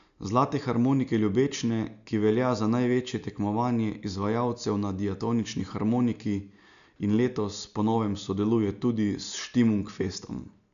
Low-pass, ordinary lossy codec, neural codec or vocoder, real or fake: 7.2 kHz; none; none; real